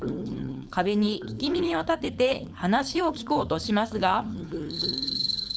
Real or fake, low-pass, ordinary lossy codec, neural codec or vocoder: fake; none; none; codec, 16 kHz, 4.8 kbps, FACodec